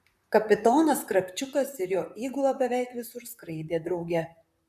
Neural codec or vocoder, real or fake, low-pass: vocoder, 44.1 kHz, 128 mel bands, Pupu-Vocoder; fake; 14.4 kHz